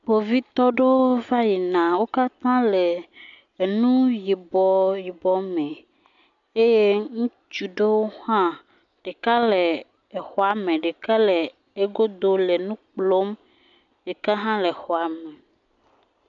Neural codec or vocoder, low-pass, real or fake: none; 7.2 kHz; real